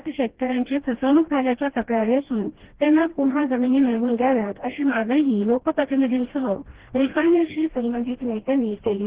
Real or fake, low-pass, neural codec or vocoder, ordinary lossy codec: fake; 3.6 kHz; codec, 16 kHz, 1 kbps, FreqCodec, smaller model; Opus, 16 kbps